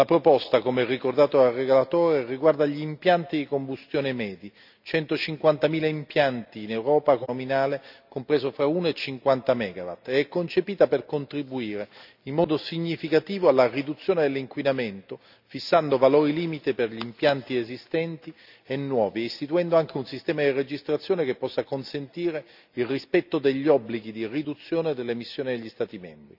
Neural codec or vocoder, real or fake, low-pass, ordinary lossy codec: none; real; 5.4 kHz; none